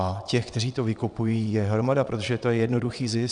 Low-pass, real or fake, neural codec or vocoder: 9.9 kHz; fake; vocoder, 22.05 kHz, 80 mel bands, Vocos